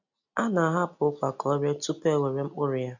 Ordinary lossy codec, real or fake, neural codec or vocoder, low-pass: none; real; none; 7.2 kHz